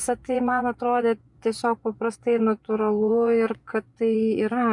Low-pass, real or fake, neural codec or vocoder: 10.8 kHz; fake; vocoder, 24 kHz, 100 mel bands, Vocos